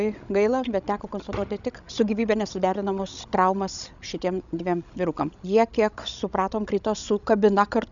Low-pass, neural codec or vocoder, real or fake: 7.2 kHz; codec, 16 kHz, 16 kbps, FreqCodec, larger model; fake